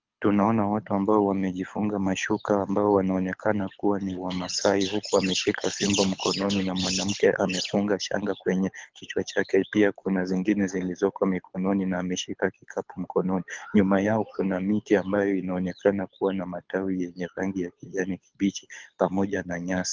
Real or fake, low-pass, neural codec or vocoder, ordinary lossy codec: fake; 7.2 kHz; codec, 24 kHz, 6 kbps, HILCodec; Opus, 32 kbps